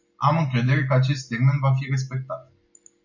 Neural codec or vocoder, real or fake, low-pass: none; real; 7.2 kHz